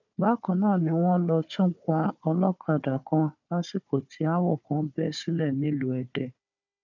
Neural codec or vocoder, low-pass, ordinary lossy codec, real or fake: codec, 16 kHz, 4 kbps, FunCodec, trained on Chinese and English, 50 frames a second; 7.2 kHz; none; fake